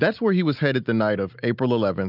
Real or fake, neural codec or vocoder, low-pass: real; none; 5.4 kHz